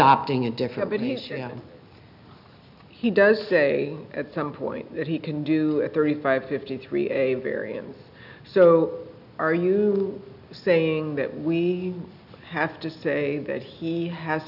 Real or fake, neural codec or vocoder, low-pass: real; none; 5.4 kHz